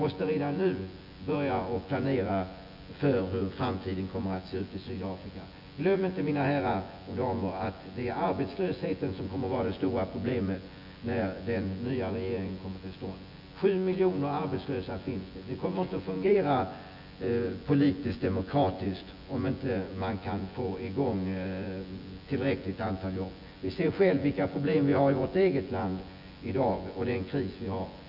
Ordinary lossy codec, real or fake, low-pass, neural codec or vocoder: none; fake; 5.4 kHz; vocoder, 24 kHz, 100 mel bands, Vocos